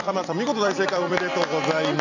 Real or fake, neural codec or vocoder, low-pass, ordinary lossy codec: fake; vocoder, 44.1 kHz, 128 mel bands every 256 samples, BigVGAN v2; 7.2 kHz; none